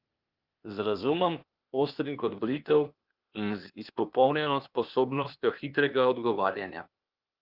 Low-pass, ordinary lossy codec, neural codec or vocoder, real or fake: 5.4 kHz; Opus, 24 kbps; codec, 16 kHz, 0.8 kbps, ZipCodec; fake